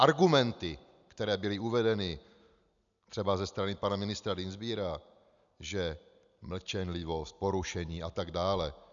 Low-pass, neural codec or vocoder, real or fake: 7.2 kHz; none; real